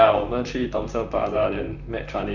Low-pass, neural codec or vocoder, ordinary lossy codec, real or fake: 7.2 kHz; vocoder, 44.1 kHz, 128 mel bands, Pupu-Vocoder; none; fake